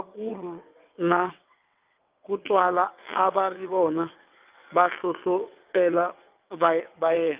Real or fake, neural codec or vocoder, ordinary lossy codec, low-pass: fake; vocoder, 22.05 kHz, 80 mel bands, WaveNeXt; Opus, 24 kbps; 3.6 kHz